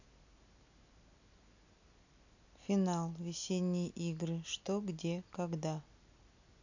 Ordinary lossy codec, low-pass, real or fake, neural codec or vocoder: none; 7.2 kHz; real; none